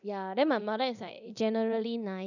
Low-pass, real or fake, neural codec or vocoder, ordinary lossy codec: 7.2 kHz; fake; codec, 24 kHz, 0.9 kbps, DualCodec; none